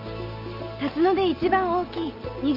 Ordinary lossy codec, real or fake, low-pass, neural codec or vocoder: Opus, 32 kbps; real; 5.4 kHz; none